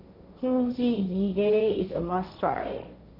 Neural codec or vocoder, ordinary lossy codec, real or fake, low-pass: codec, 16 kHz, 1.1 kbps, Voila-Tokenizer; none; fake; 5.4 kHz